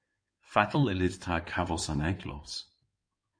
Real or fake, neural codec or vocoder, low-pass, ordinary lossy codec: fake; codec, 16 kHz in and 24 kHz out, 2.2 kbps, FireRedTTS-2 codec; 9.9 kHz; MP3, 48 kbps